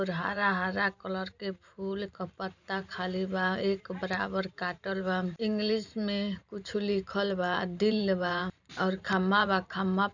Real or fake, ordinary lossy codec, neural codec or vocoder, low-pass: fake; none; vocoder, 44.1 kHz, 128 mel bands every 512 samples, BigVGAN v2; 7.2 kHz